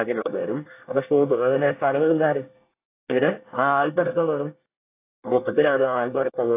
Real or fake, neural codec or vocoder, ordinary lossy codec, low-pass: fake; codec, 24 kHz, 1 kbps, SNAC; AAC, 24 kbps; 3.6 kHz